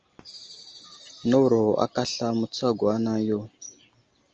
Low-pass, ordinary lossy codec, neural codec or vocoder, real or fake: 7.2 kHz; Opus, 24 kbps; none; real